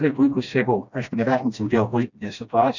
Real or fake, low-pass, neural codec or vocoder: fake; 7.2 kHz; codec, 16 kHz, 1 kbps, FreqCodec, smaller model